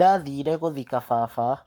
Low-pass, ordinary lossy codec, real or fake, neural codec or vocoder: none; none; fake; codec, 44.1 kHz, 7.8 kbps, Pupu-Codec